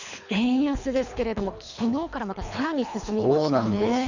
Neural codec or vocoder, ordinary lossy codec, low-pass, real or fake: codec, 24 kHz, 3 kbps, HILCodec; none; 7.2 kHz; fake